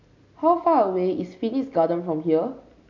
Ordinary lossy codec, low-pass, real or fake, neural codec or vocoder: MP3, 48 kbps; 7.2 kHz; real; none